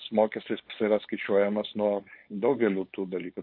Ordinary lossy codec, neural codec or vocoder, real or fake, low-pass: MP3, 32 kbps; codec, 16 kHz, 4.8 kbps, FACodec; fake; 5.4 kHz